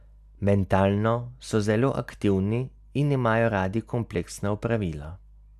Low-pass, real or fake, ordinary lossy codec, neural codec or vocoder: 14.4 kHz; real; none; none